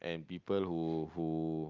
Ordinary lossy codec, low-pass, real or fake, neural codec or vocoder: Opus, 32 kbps; 7.2 kHz; real; none